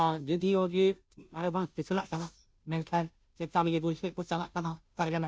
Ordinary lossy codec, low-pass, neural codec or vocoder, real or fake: none; none; codec, 16 kHz, 0.5 kbps, FunCodec, trained on Chinese and English, 25 frames a second; fake